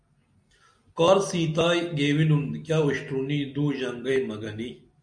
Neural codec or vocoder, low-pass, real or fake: none; 9.9 kHz; real